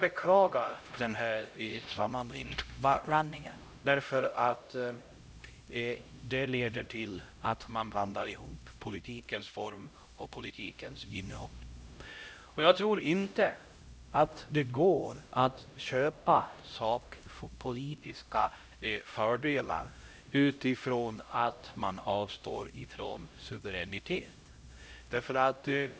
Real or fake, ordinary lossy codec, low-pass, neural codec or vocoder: fake; none; none; codec, 16 kHz, 0.5 kbps, X-Codec, HuBERT features, trained on LibriSpeech